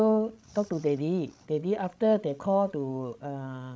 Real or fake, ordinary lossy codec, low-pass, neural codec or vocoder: fake; none; none; codec, 16 kHz, 16 kbps, FreqCodec, larger model